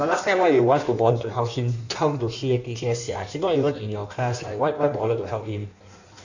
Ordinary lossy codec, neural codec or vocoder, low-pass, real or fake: none; codec, 16 kHz in and 24 kHz out, 1.1 kbps, FireRedTTS-2 codec; 7.2 kHz; fake